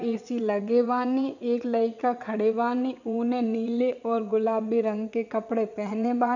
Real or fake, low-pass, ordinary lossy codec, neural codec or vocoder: fake; 7.2 kHz; none; vocoder, 22.05 kHz, 80 mel bands, WaveNeXt